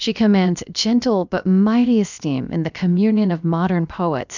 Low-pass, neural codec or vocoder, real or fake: 7.2 kHz; codec, 16 kHz, 0.7 kbps, FocalCodec; fake